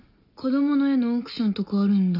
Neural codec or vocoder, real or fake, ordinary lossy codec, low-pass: none; real; none; 5.4 kHz